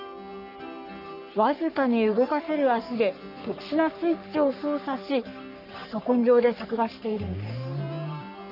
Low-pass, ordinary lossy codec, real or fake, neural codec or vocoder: 5.4 kHz; none; fake; codec, 44.1 kHz, 3.4 kbps, Pupu-Codec